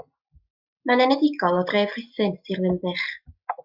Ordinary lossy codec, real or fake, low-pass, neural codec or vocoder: Opus, 64 kbps; real; 5.4 kHz; none